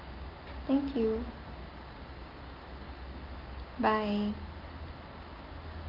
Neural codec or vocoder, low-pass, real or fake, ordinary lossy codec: none; 5.4 kHz; real; Opus, 32 kbps